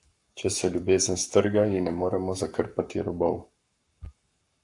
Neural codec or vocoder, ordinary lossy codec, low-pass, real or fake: codec, 44.1 kHz, 7.8 kbps, Pupu-Codec; AAC, 64 kbps; 10.8 kHz; fake